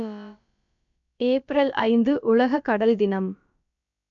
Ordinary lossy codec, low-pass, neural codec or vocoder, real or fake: none; 7.2 kHz; codec, 16 kHz, about 1 kbps, DyCAST, with the encoder's durations; fake